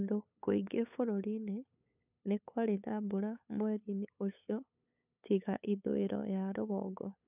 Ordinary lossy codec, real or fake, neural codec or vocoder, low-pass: none; fake; codec, 16 kHz, 8 kbps, FunCodec, trained on LibriTTS, 25 frames a second; 3.6 kHz